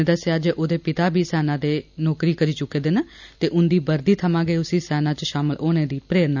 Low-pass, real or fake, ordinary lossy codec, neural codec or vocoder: 7.2 kHz; real; none; none